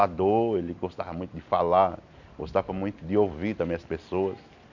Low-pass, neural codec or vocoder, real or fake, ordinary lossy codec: 7.2 kHz; none; real; none